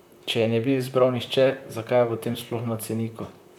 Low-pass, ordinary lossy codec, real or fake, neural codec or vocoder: 19.8 kHz; none; fake; vocoder, 44.1 kHz, 128 mel bands, Pupu-Vocoder